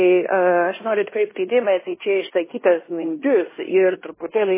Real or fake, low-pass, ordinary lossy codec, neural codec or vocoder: fake; 3.6 kHz; MP3, 16 kbps; codec, 16 kHz in and 24 kHz out, 0.9 kbps, LongCat-Audio-Codec, fine tuned four codebook decoder